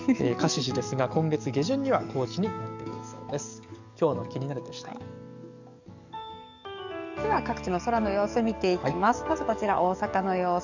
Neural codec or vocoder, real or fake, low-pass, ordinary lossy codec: codec, 44.1 kHz, 7.8 kbps, DAC; fake; 7.2 kHz; none